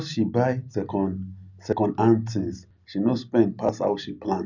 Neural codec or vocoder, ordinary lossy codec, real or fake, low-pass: none; none; real; 7.2 kHz